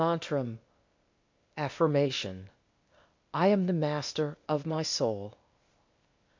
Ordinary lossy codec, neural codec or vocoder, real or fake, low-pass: MP3, 48 kbps; codec, 16 kHz, 0.8 kbps, ZipCodec; fake; 7.2 kHz